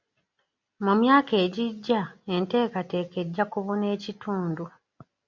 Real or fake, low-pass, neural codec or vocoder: real; 7.2 kHz; none